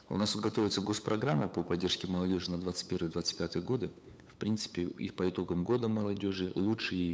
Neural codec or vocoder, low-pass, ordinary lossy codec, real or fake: codec, 16 kHz, 8 kbps, FunCodec, trained on LibriTTS, 25 frames a second; none; none; fake